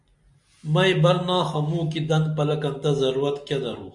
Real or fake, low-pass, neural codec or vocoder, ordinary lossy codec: real; 10.8 kHz; none; MP3, 96 kbps